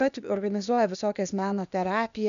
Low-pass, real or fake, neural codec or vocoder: 7.2 kHz; fake; codec, 16 kHz, 0.8 kbps, ZipCodec